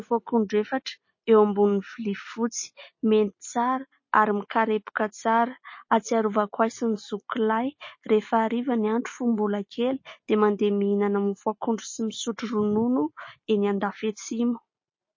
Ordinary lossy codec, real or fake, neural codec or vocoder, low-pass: MP3, 32 kbps; real; none; 7.2 kHz